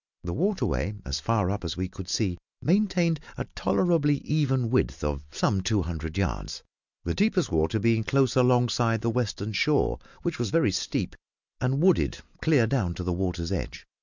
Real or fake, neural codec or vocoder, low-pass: real; none; 7.2 kHz